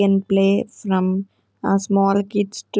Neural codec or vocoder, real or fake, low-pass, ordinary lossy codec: none; real; none; none